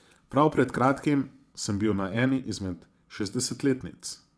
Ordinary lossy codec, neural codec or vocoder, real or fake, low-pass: none; vocoder, 22.05 kHz, 80 mel bands, WaveNeXt; fake; none